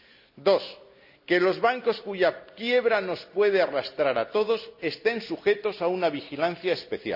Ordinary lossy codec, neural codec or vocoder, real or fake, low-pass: none; none; real; 5.4 kHz